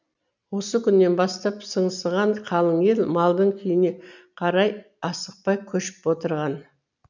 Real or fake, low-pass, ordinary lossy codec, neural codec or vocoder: real; 7.2 kHz; none; none